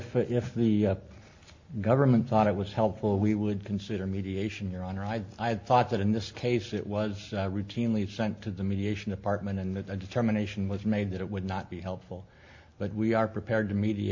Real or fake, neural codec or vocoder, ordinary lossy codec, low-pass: real; none; MP3, 48 kbps; 7.2 kHz